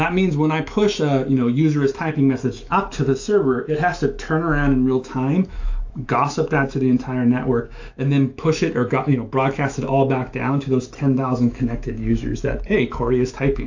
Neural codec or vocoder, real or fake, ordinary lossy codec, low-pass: autoencoder, 48 kHz, 128 numbers a frame, DAC-VAE, trained on Japanese speech; fake; AAC, 48 kbps; 7.2 kHz